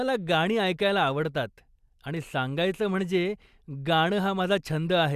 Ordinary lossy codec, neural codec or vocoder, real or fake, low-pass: Opus, 64 kbps; none; real; 14.4 kHz